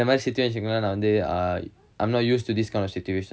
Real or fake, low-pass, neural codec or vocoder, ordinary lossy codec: real; none; none; none